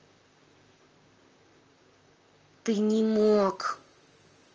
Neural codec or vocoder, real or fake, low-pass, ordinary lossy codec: vocoder, 44.1 kHz, 128 mel bands, Pupu-Vocoder; fake; 7.2 kHz; Opus, 24 kbps